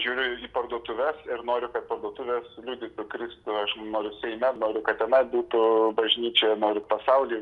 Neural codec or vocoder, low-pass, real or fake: none; 10.8 kHz; real